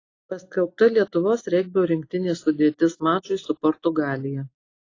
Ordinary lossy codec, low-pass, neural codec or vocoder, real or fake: AAC, 32 kbps; 7.2 kHz; none; real